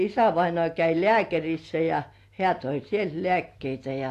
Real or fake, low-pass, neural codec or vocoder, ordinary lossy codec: real; 14.4 kHz; none; AAC, 64 kbps